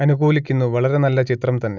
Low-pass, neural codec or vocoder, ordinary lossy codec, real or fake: 7.2 kHz; none; none; real